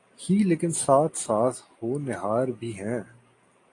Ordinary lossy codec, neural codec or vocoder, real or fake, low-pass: AAC, 48 kbps; none; real; 10.8 kHz